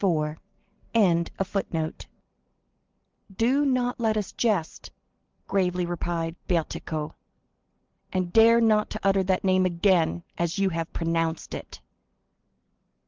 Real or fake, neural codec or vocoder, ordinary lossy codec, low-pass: real; none; Opus, 16 kbps; 7.2 kHz